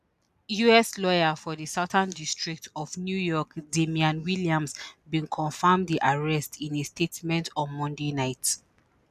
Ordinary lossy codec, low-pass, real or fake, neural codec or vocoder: none; 14.4 kHz; real; none